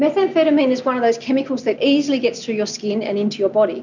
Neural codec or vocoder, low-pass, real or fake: none; 7.2 kHz; real